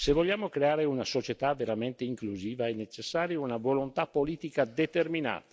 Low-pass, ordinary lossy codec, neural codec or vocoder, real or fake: none; none; none; real